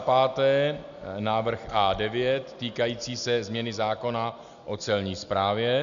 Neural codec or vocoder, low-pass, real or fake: none; 7.2 kHz; real